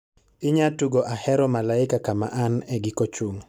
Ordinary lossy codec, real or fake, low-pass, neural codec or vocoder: none; real; none; none